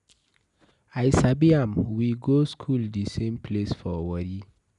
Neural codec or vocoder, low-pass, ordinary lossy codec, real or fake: none; 10.8 kHz; none; real